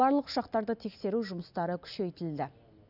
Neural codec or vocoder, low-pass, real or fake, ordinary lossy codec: none; 5.4 kHz; real; none